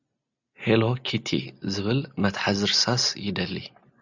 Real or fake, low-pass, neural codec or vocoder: real; 7.2 kHz; none